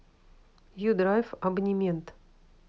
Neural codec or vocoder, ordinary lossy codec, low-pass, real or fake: none; none; none; real